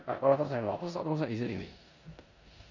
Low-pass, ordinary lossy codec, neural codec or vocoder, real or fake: 7.2 kHz; none; codec, 16 kHz in and 24 kHz out, 0.9 kbps, LongCat-Audio-Codec, four codebook decoder; fake